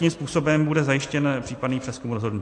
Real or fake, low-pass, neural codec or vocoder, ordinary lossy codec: real; 10.8 kHz; none; AAC, 48 kbps